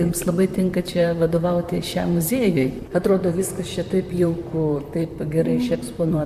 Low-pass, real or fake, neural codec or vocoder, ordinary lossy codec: 14.4 kHz; fake; vocoder, 44.1 kHz, 128 mel bands, Pupu-Vocoder; MP3, 96 kbps